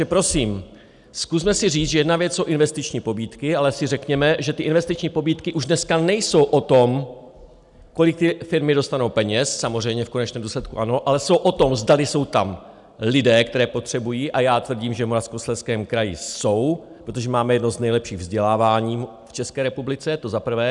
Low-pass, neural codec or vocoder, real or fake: 10.8 kHz; none; real